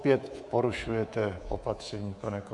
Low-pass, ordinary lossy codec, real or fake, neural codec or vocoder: 10.8 kHz; MP3, 96 kbps; fake; codec, 24 kHz, 3.1 kbps, DualCodec